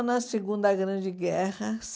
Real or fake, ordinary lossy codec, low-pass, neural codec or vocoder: real; none; none; none